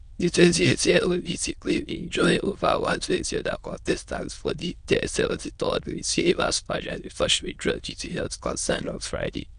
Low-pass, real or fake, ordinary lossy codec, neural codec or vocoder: 9.9 kHz; fake; none; autoencoder, 22.05 kHz, a latent of 192 numbers a frame, VITS, trained on many speakers